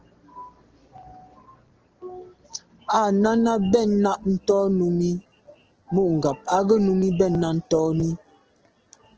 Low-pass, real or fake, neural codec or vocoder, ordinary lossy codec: 7.2 kHz; real; none; Opus, 16 kbps